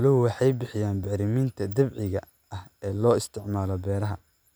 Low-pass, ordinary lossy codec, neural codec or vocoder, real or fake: none; none; none; real